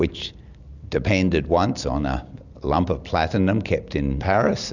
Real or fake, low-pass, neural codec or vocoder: real; 7.2 kHz; none